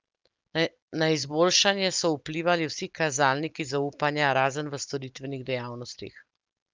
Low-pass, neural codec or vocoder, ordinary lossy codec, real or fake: 7.2 kHz; none; Opus, 24 kbps; real